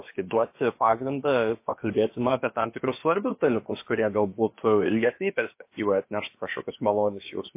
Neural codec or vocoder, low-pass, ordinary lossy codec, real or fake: codec, 16 kHz, about 1 kbps, DyCAST, with the encoder's durations; 3.6 kHz; MP3, 24 kbps; fake